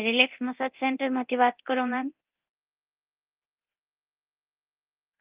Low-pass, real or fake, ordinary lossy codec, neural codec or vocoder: 3.6 kHz; fake; Opus, 32 kbps; codec, 24 kHz, 0.9 kbps, DualCodec